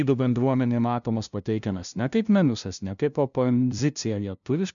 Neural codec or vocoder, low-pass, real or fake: codec, 16 kHz, 0.5 kbps, FunCodec, trained on LibriTTS, 25 frames a second; 7.2 kHz; fake